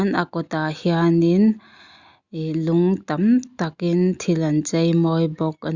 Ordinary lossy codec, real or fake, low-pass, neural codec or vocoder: Opus, 64 kbps; real; 7.2 kHz; none